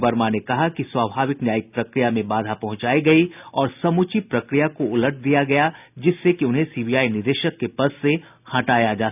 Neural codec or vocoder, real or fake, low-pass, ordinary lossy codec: none; real; 3.6 kHz; none